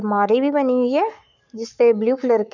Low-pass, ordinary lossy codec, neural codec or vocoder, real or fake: 7.2 kHz; none; codec, 44.1 kHz, 7.8 kbps, Pupu-Codec; fake